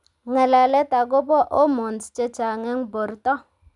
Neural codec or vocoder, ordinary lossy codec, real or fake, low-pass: none; none; real; 10.8 kHz